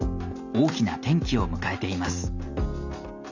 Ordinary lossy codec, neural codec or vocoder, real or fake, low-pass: none; none; real; 7.2 kHz